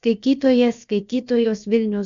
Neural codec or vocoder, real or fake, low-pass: codec, 16 kHz, about 1 kbps, DyCAST, with the encoder's durations; fake; 7.2 kHz